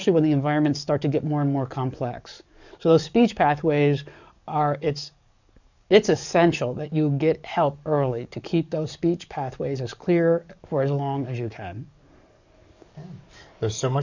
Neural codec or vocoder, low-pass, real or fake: codec, 44.1 kHz, 7.8 kbps, DAC; 7.2 kHz; fake